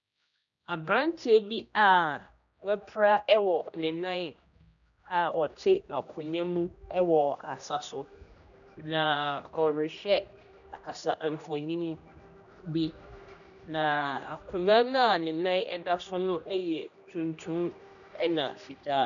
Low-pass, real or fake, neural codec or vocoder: 7.2 kHz; fake; codec, 16 kHz, 1 kbps, X-Codec, HuBERT features, trained on general audio